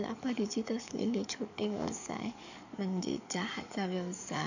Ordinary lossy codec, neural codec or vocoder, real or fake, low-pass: none; codec, 44.1 kHz, 7.8 kbps, DAC; fake; 7.2 kHz